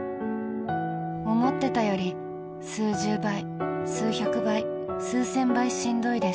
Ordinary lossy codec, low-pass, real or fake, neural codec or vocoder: none; none; real; none